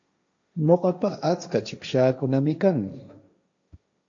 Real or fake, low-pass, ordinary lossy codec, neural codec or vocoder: fake; 7.2 kHz; MP3, 48 kbps; codec, 16 kHz, 1.1 kbps, Voila-Tokenizer